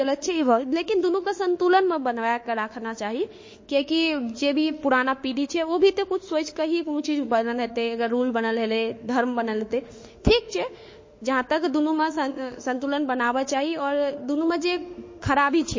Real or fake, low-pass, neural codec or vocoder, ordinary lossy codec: fake; 7.2 kHz; autoencoder, 48 kHz, 32 numbers a frame, DAC-VAE, trained on Japanese speech; MP3, 32 kbps